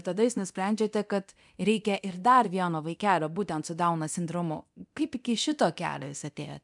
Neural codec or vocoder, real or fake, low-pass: codec, 24 kHz, 0.9 kbps, DualCodec; fake; 10.8 kHz